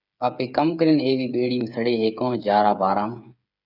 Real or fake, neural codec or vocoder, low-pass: fake; codec, 16 kHz, 8 kbps, FreqCodec, smaller model; 5.4 kHz